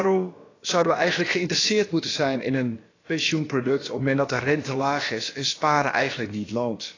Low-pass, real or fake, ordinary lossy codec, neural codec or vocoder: 7.2 kHz; fake; AAC, 32 kbps; codec, 16 kHz, about 1 kbps, DyCAST, with the encoder's durations